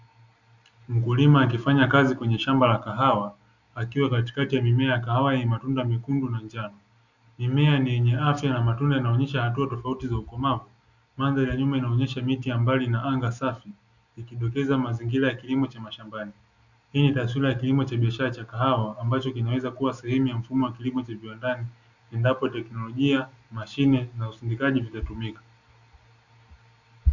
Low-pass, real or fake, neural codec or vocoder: 7.2 kHz; real; none